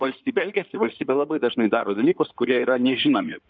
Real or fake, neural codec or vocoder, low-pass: fake; codec, 16 kHz in and 24 kHz out, 2.2 kbps, FireRedTTS-2 codec; 7.2 kHz